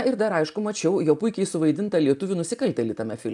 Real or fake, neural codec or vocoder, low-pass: real; none; 10.8 kHz